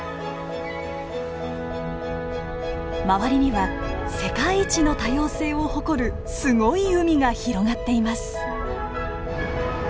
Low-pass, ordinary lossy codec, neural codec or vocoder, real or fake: none; none; none; real